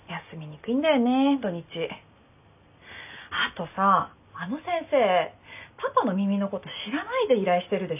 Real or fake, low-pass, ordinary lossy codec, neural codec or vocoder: real; 3.6 kHz; none; none